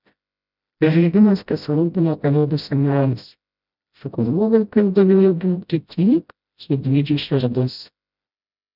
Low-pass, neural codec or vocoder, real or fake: 5.4 kHz; codec, 16 kHz, 0.5 kbps, FreqCodec, smaller model; fake